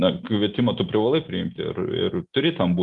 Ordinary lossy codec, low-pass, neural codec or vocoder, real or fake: Opus, 24 kbps; 10.8 kHz; none; real